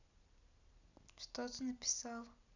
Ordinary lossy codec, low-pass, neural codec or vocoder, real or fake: none; 7.2 kHz; none; real